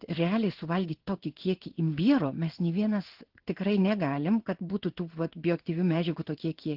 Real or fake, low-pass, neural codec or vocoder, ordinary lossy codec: fake; 5.4 kHz; codec, 16 kHz in and 24 kHz out, 1 kbps, XY-Tokenizer; Opus, 16 kbps